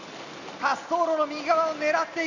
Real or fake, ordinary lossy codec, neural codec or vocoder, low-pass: real; Opus, 64 kbps; none; 7.2 kHz